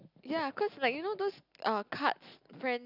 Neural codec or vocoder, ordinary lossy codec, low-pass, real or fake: none; none; 5.4 kHz; real